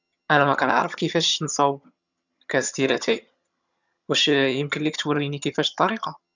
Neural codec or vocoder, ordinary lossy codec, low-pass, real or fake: vocoder, 22.05 kHz, 80 mel bands, HiFi-GAN; none; 7.2 kHz; fake